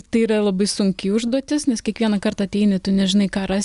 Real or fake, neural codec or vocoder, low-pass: real; none; 10.8 kHz